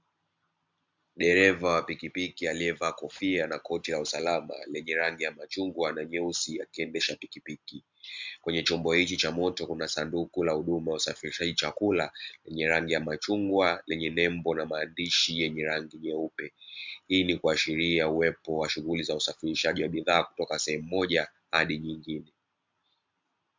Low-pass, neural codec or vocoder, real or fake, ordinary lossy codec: 7.2 kHz; none; real; MP3, 64 kbps